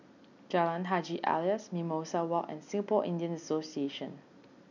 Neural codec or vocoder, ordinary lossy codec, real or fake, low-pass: none; none; real; 7.2 kHz